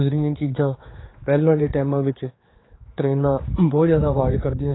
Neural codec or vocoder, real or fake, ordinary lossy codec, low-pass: codec, 16 kHz, 4 kbps, X-Codec, HuBERT features, trained on general audio; fake; AAC, 16 kbps; 7.2 kHz